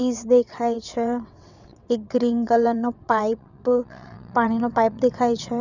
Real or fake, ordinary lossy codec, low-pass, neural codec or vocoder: fake; none; 7.2 kHz; vocoder, 22.05 kHz, 80 mel bands, WaveNeXt